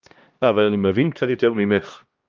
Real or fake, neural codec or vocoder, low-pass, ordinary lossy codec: fake; codec, 16 kHz, 1 kbps, X-Codec, HuBERT features, trained on LibriSpeech; 7.2 kHz; Opus, 32 kbps